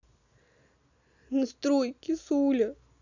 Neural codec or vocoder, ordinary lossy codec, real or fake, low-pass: none; none; real; 7.2 kHz